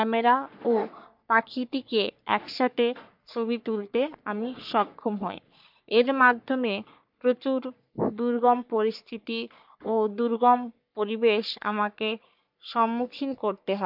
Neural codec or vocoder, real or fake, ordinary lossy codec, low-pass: codec, 44.1 kHz, 3.4 kbps, Pupu-Codec; fake; MP3, 48 kbps; 5.4 kHz